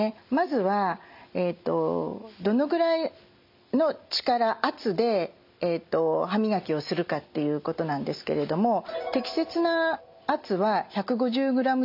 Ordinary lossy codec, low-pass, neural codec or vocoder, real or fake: MP3, 48 kbps; 5.4 kHz; none; real